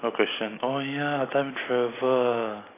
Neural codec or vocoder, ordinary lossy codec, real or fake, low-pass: none; AAC, 16 kbps; real; 3.6 kHz